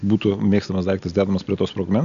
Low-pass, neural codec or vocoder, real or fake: 7.2 kHz; none; real